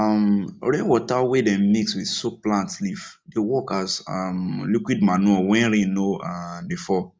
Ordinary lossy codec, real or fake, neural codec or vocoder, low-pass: none; real; none; none